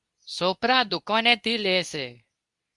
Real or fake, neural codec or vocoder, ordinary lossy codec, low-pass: fake; codec, 24 kHz, 0.9 kbps, WavTokenizer, medium speech release version 2; Opus, 64 kbps; 10.8 kHz